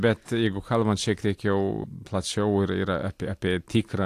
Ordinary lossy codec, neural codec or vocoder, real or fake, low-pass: AAC, 64 kbps; none; real; 14.4 kHz